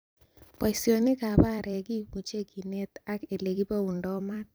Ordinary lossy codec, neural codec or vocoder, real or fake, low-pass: none; vocoder, 44.1 kHz, 128 mel bands every 256 samples, BigVGAN v2; fake; none